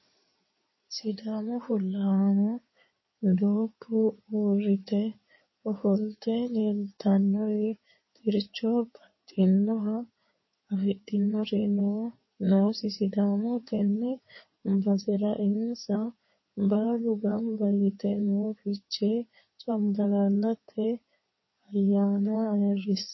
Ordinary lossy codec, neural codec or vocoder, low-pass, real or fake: MP3, 24 kbps; codec, 16 kHz in and 24 kHz out, 2.2 kbps, FireRedTTS-2 codec; 7.2 kHz; fake